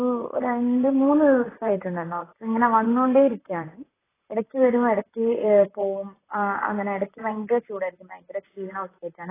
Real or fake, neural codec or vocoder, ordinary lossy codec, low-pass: fake; vocoder, 44.1 kHz, 128 mel bands, Pupu-Vocoder; AAC, 16 kbps; 3.6 kHz